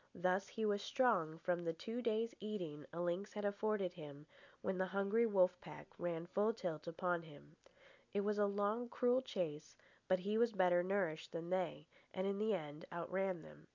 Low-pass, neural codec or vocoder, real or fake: 7.2 kHz; none; real